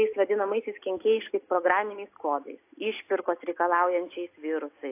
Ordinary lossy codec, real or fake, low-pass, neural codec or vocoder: AAC, 24 kbps; real; 3.6 kHz; none